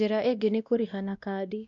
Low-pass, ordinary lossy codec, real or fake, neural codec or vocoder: 7.2 kHz; none; fake; codec, 16 kHz, 1 kbps, X-Codec, WavLM features, trained on Multilingual LibriSpeech